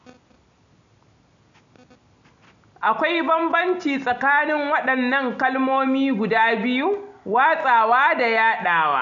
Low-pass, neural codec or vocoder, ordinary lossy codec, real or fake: 7.2 kHz; none; none; real